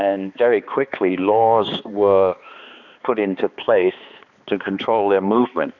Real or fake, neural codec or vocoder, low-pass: fake; codec, 16 kHz, 2 kbps, X-Codec, HuBERT features, trained on balanced general audio; 7.2 kHz